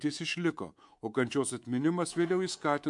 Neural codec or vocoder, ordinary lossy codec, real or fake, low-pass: codec, 24 kHz, 3.1 kbps, DualCodec; MP3, 64 kbps; fake; 10.8 kHz